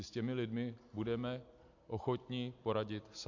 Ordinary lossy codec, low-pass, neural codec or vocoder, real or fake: AAC, 48 kbps; 7.2 kHz; none; real